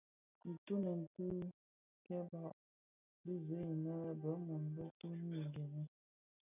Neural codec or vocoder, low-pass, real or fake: none; 3.6 kHz; real